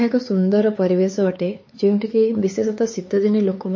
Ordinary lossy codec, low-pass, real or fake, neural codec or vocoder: MP3, 32 kbps; 7.2 kHz; fake; codec, 16 kHz, 4 kbps, X-Codec, HuBERT features, trained on LibriSpeech